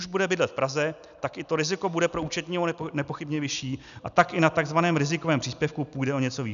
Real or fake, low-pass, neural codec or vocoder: real; 7.2 kHz; none